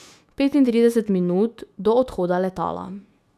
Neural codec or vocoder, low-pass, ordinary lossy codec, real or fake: autoencoder, 48 kHz, 128 numbers a frame, DAC-VAE, trained on Japanese speech; 14.4 kHz; none; fake